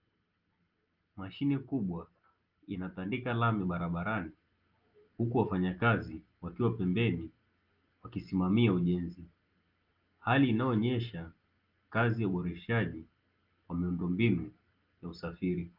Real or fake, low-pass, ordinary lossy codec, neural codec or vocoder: real; 5.4 kHz; Opus, 32 kbps; none